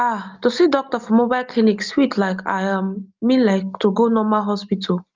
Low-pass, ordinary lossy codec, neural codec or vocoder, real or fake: 7.2 kHz; Opus, 32 kbps; none; real